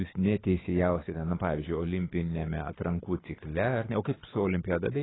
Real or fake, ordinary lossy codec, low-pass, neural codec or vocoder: fake; AAC, 16 kbps; 7.2 kHz; codec, 24 kHz, 6 kbps, HILCodec